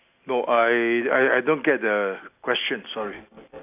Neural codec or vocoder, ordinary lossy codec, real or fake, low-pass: none; AAC, 32 kbps; real; 3.6 kHz